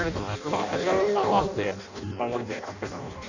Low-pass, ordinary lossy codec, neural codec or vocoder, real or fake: 7.2 kHz; none; codec, 16 kHz in and 24 kHz out, 0.6 kbps, FireRedTTS-2 codec; fake